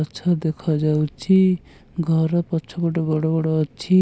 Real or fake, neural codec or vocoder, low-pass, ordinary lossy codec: real; none; none; none